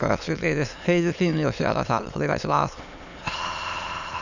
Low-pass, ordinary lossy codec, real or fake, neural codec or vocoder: 7.2 kHz; none; fake; autoencoder, 22.05 kHz, a latent of 192 numbers a frame, VITS, trained on many speakers